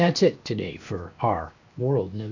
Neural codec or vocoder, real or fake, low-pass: codec, 16 kHz, 0.7 kbps, FocalCodec; fake; 7.2 kHz